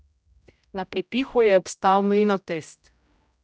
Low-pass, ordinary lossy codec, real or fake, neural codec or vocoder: none; none; fake; codec, 16 kHz, 0.5 kbps, X-Codec, HuBERT features, trained on general audio